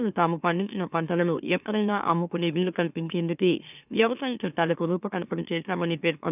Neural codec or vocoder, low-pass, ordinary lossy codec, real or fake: autoencoder, 44.1 kHz, a latent of 192 numbers a frame, MeloTTS; 3.6 kHz; none; fake